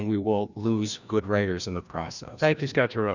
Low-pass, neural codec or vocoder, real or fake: 7.2 kHz; codec, 16 kHz, 1 kbps, FreqCodec, larger model; fake